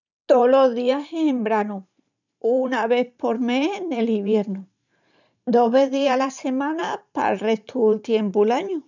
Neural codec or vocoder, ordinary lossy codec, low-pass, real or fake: vocoder, 44.1 kHz, 128 mel bands every 512 samples, BigVGAN v2; none; 7.2 kHz; fake